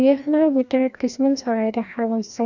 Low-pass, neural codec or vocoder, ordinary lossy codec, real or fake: 7.2 kHz; codec, 16 kHz, 1 kbps, FreqCodec, larger model; none; fake